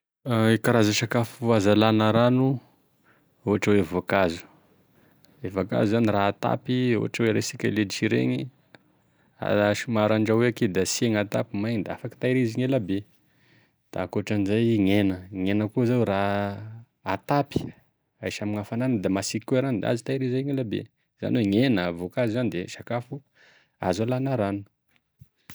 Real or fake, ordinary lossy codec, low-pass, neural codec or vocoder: real; none; none; none